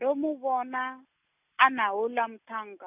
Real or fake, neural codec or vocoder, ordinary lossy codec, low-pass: real; none; none; 3.6 kHz